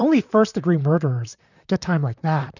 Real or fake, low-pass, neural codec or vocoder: fake; 7.2 kHz; vocoder, 44.1 kHz, 128 mel bands, Pupu-Vocoder